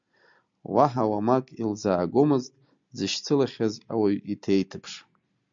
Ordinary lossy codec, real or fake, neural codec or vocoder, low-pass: MP3, 64 kbps; real; none; 7.2 kHz